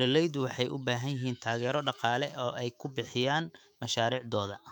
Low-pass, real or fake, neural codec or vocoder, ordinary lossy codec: 19.8 kHz; fake; autoencoder, 48 kHz, 128 numbers a frame, DAC-VAE, trained on Japanese speech; none